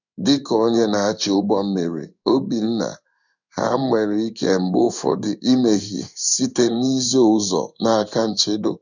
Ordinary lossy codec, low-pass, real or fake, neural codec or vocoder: none; 7.2 kHz; fake; codec, 16 kHz in and 24 kHz out, 1 kbps, XY-Tokenizer